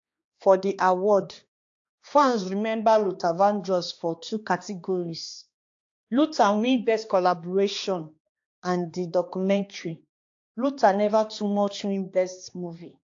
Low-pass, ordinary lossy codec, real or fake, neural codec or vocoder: 7.2 kHz; AAC, 48 kbps; fake; codec, 16 kHz, 2 kbps, X-Codec, HuBERT features, trained on balanced general audio